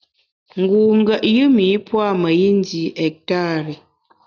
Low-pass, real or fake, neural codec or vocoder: 7.2 kHz; real; none